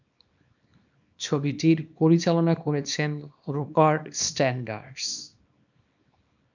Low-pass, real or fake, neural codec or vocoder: 7.2 kHz; fake; codec, 24 kHz, 0.9 kbps, WavTokenizer, small release